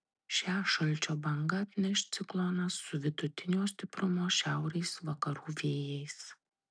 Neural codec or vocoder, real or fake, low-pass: none; real; 9.9 kHz